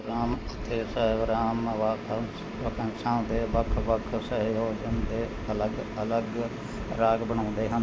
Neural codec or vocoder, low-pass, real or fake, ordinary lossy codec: none; none; real; none